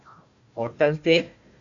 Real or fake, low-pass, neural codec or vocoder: fake; 7.2 kHz; codec, 16 kHz, 1 kbps, FunCodec, trained on Chinese and English, 50 frames a second